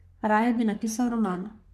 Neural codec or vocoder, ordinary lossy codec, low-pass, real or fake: codec, 44.1 kHz, 3.4 kbps, Pupu-Codec; none; 14.4 kHz; fake